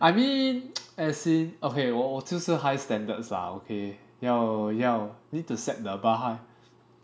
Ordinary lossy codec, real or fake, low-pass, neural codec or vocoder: none; real; none; none